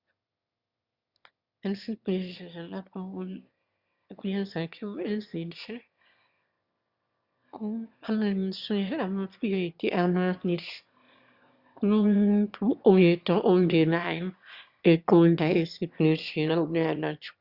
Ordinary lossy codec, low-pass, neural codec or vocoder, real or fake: Opus, 64 kbps; 5.4 kHz; autoencoder, 22.05 kHz, a latent of 192 numbers a frame, VITS, trained on one speaker; fake